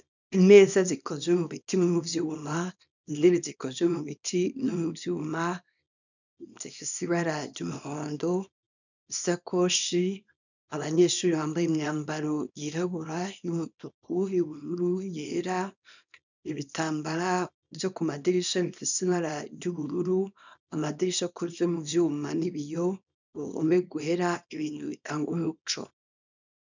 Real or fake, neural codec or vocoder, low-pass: fake; codec, 24 kHz, 0.9 kbps, WavTokenizer, small release; 7.2 kHz